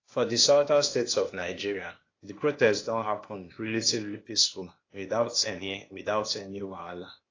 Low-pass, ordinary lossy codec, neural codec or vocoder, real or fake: 7.2 kHz; AAC, 32 kbps; codec, 16 kHz, 0.8 kbps, ZipCodec; fake